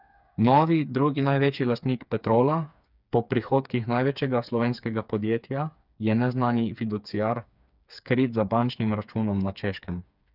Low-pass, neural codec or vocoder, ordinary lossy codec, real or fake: 5.4 kHz; codec, 16 kHz, 4 kbps, FreqCodec, smaller model; none; fake